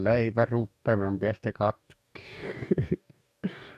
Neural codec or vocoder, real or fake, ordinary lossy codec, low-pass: codec, 44.1 kHz, 2.6 kbps, DAC; fake; none; 14.4 kHz